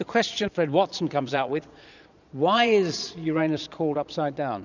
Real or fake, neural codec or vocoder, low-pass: fake; vocoder, 22.05 kHz, 80 mel bands, Vocos; 7.2 kHz